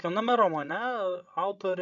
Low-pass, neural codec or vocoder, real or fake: 7.2 kHz; codec, 16 kHz, 16 kbps, FreqCodec, larger model; fake